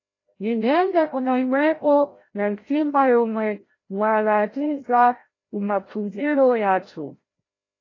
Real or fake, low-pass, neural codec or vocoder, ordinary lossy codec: fake; 7.2 kHz; codec, 16 kHz, 0.5 kbps, FreqCodec, larger model; AAC, 32 kbps